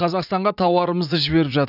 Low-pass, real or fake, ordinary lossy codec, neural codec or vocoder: 5.4 kHz; fake; none; vocoder, 44.1 kHz, 80 mel bands, Vocos